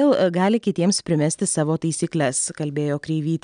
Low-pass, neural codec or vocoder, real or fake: 9.9 kHz; none; real